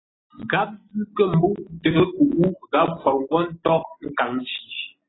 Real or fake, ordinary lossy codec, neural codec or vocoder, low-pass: real; AAC, 16 kbps; none; 7.2 kHz